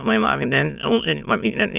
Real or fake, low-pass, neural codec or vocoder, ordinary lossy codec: fake; 3.6 kHz; autoencoder, 22.05 kHz, a latent of 192 numbers a frame, VITS, trained on many speakers; none